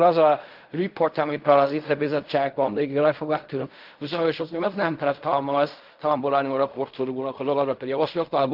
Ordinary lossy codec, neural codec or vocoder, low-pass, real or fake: Opus, 32 kbps; codec, 16 kHz in and 24 kHz out, 0.4 kbps, LongCat-Audio-Codec, fine tuned four codebook decoder; 5.4 kHz; fake